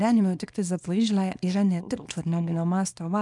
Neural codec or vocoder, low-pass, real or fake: codec, 24 kHz, 0.9 kbps, WavTokenizer, small release; 10.8 kHz; fake